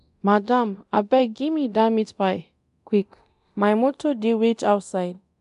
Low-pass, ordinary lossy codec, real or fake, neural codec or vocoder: 10.8 kHz; none; fake; codec, 24 kHz, 0.9 kbps, DualCodec